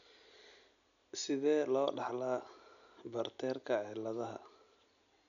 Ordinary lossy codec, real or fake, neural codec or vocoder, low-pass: none; real; none; 7.2 kHz